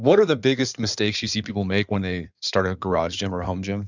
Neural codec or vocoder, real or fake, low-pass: codec, 16 kHz in and 24 kHz out, 2.2 kbps, FireRedTTS-2 codec; fake; 7.2 kHz